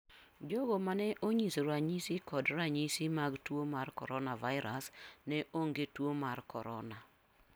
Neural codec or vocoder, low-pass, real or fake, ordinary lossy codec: none; none; real; none